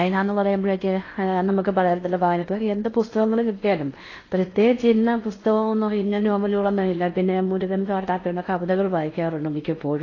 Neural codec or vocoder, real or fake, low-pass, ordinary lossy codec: codec, 16 kHz in and 24 kHz out, 0.8 kbps, FocalCodec, streaming, 65536 codes; fake; 7.2 kHz; AAC, 32 kbps